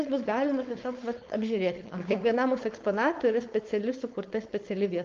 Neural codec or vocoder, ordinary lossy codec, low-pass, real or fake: codec, 16 kHz, 4.8 kbps, FACodec; Opus, 24 kbps; 7.2 kHz; fake